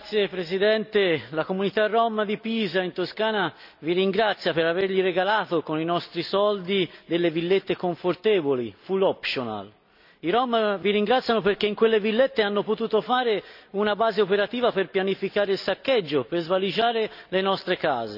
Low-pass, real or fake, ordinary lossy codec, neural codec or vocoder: 5.4 kHz; real; none; none